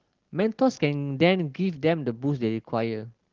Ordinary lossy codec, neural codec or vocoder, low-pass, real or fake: Opus, 16 kbps; none; 7.2 kHz; real